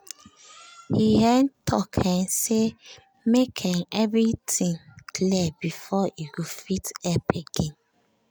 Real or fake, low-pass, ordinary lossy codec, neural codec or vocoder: real; none; none; none